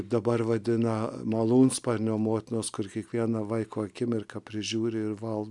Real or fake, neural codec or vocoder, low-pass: real; none; 10.8 kHz